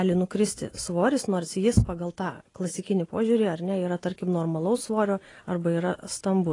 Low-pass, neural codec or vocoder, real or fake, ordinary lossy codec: 10.8 kHz; none; real; AAC, 32 kbps